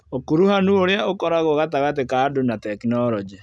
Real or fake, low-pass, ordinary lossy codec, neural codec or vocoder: real; none; none; none